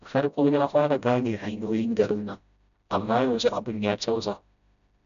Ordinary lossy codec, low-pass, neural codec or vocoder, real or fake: none; 7.2 kHz; codec, 16 kHz, 0.5 kbps, FreqCodec, smaller model; fake